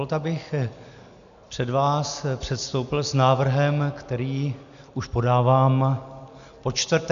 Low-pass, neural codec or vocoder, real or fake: 7.2 kHz; none; real